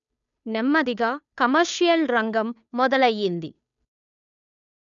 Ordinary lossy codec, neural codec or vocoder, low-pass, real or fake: none; codec, 16 kHz, 2 kbps, FunCodec, trained on Chinese and English, 25 frames a second; 7.2 kHz; fake